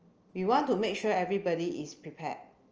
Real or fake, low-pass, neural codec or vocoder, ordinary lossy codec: real; 7.2 kHz; none; Opus, 24 kbps